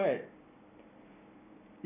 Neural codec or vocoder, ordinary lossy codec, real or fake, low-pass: none; none; real; 3.6 kHz